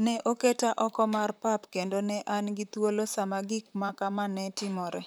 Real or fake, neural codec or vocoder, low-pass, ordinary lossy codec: fake; vocoder, 44.1 kHz, 128 mel bands, Pupu-Vocoder; none; none